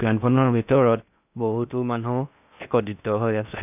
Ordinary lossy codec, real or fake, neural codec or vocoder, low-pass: none; fake; codec, 16 kHz in and 24 kHz out, 0.6 kbps, FocalCodec, streaming, 4096 codes; 3.6 kHz